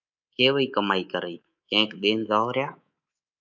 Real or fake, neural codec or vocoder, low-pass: fake; codec, 24 kHz, 3.1 kbps, DualCodec; 7.2 kHz